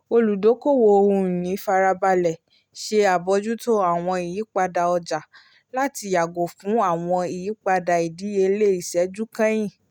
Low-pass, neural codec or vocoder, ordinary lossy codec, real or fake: 19.8 kHz; none; none; real